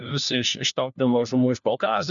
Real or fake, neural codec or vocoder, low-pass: fake; codec, 16 kHz, 1 kbps, FunCodec, trained on LibriTTS, 50 frames a second; 7.2 kHz